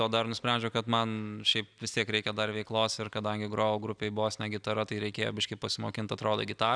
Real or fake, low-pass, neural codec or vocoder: real; 9.9 kHz; none